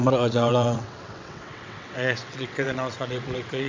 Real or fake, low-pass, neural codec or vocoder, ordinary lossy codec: fake; 7.2 kHz; vocoder, 22.05 kHz, 80 mel bands, WaveNeXt; MP3, 64 kbps